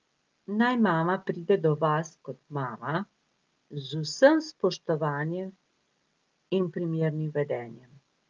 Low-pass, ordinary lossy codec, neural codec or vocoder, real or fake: 7.2 kHz; Opus, 24 kbps; none; real